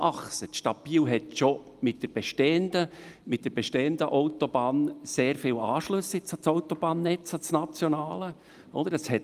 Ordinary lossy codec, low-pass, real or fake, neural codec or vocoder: Opus, 32 kbps; 14.4 kHz; real; none